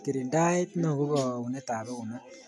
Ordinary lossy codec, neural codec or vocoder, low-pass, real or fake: none; none; none; real